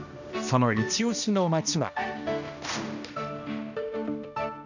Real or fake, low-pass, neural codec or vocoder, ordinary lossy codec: fake; 7.2 kHz; codec, 16 kHz, 1 kbps, X-Codec, HuBERT features, trained on balanced general audio; none